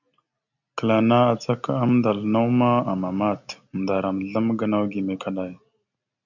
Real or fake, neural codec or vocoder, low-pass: real; none; 7.2 kHz